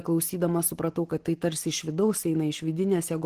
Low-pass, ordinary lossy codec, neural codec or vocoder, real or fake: 14.4 kHz; Opus, 16 kbps; none; real